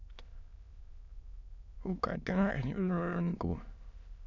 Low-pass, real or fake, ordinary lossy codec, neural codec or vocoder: 7.2 kHz; fake; none; autoencoder, 22.05 kHz, a latent of 192 numbers a frame, VITS, trained on many speakers